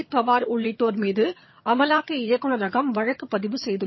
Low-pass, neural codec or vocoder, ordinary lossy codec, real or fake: 7.2 kHz; vocoder, 22.05 kHz, 80 mel bands, HiFi-GAN; MP3, 24 kbps; fake